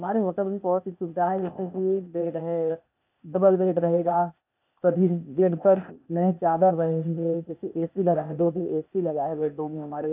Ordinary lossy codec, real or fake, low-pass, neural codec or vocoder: none; fake; 3.6 kHz; codec, 16 kHz, 0.8 kbps, ZipCodec